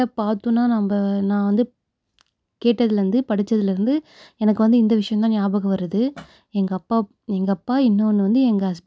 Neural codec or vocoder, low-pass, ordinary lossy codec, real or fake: none; none; none; real